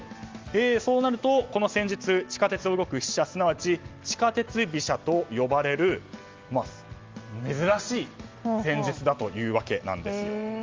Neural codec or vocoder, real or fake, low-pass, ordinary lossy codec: none; real; 7.2 kHz; Opus, 32 kbps